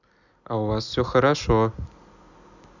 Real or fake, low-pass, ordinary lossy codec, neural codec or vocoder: real; 7.2 kHz; none; none